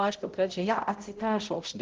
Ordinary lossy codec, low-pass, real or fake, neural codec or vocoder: Opus, 16 kbps; 7.2 kHz; fake; codec, 16 kHz, 0.5 kbps, X-Codec, HuBERT features, trained on balanced general audio